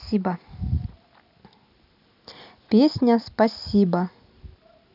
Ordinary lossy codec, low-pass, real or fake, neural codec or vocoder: none; 5.4 kHz; real; none